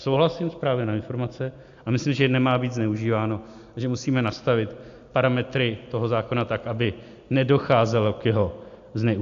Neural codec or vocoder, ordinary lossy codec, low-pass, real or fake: none; AAC, 64 kbps; 7.2 kHz; real